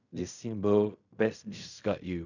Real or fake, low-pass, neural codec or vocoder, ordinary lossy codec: fake; 7.2 kHz; codec, 16 kHz in and 24 kHz out, 0.4 kbps, LongCat-Audio-Codec, fine tuned four codebook decoder; none